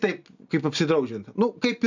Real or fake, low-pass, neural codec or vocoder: real; 7.2 kHz; none